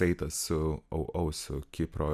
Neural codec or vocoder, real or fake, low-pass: none; real; 14.4 kHz